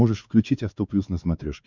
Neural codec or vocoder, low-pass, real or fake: codec, 16 kHz, 4 kbps, X-Codec, WavLM features, trained on Multilingual LibriSpeech; 7.2 kHz; fake